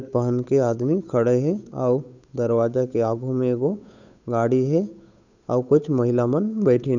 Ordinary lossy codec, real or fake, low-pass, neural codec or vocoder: none; fake; 7.2 kHz; codec, 16 kHz, 8 kbps, FunCodec, trained on Chinese and English, 25 frames a second